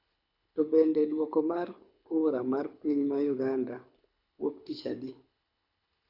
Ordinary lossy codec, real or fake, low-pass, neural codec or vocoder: none; fake; 5.4 kHz; codec, 24 kHz, 6 kbps, HILCodec